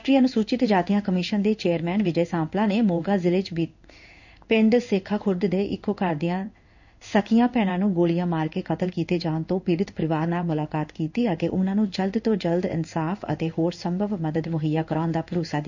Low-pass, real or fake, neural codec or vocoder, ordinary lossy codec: 7.2 kHz; fake; codec, 16 kHz in and 24 kHz out, 1 kbps, XY-Tokenizer; none